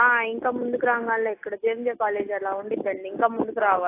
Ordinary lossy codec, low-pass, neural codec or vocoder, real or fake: AAC, 24 kbps; 3.6 kHz; none; real